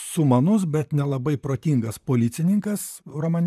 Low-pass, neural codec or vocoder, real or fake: 14.4 kHz; vocoder, 44.1 kHz, 128 mel bands, Pupu-Vocoder; fake